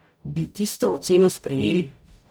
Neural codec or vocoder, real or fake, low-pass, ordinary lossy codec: codec, 44.1 kHz, 0.9 kbps, DAC; fake; none; none